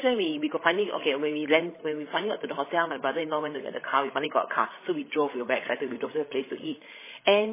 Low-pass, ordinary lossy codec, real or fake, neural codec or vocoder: 3.6 kHz; MP3, 16 kbps; fake; codec, 16 kHz, 16 kbps, FreqCodec, larger model